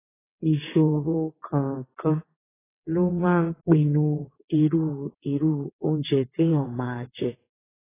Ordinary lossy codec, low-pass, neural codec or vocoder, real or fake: AAC, 16 kbps; 3.6 kHz; vocoder, 22.05 kHz, 80 mel bands, WaveNeXt; fake